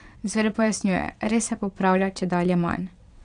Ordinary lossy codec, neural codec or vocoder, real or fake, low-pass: none; vocoder, 22.05 kHz, 80 mel bands, WaveNeXt; fake; 9.9 kHz